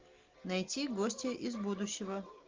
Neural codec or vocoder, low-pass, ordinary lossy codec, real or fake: none; 7.2 kHz; Opus, 32 kbps; real